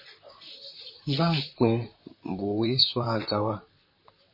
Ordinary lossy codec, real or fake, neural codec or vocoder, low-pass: MP3, 24 kbps; fake; vocoder, 44.1 kHz, 128 mel bands, Pupu-Vocoder; 5.4 kHz